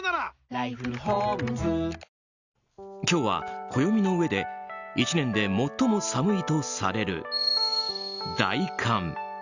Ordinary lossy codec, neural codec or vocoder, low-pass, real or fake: Opus, 64 kbps; none; 7.2 kHz; real